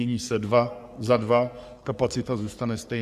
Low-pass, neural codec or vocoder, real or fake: 14.4 kHz; codec, 44.1 kHz, 3.4 kbps, Pupu-Codec; fake